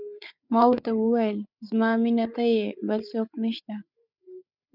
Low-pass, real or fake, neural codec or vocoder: 5.4 kHz; fake; codec, 16 kHz, 8 kbps, FreqCodec, larger model